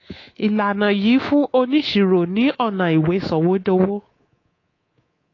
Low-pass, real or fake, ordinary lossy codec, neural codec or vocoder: 7.2 kHz; fake; AAC, 32 kbps; codec, 24 kHz, 3.1 kbps, DualCodec